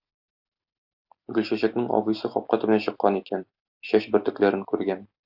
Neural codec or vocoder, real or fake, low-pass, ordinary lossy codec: none; real; 5.4 kHz; AAC, 48 kbps